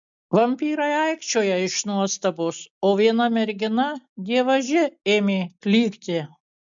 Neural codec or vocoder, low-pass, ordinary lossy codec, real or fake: none; 7.2 kHz; MP3, 64 kbps; real